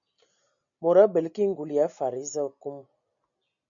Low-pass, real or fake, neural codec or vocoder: 7.2 kHz; real; none